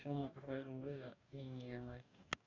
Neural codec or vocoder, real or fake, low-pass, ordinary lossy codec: codec, 44.1 kHz, 2.6 kbps, DAC; fake; 7.2 kHz; none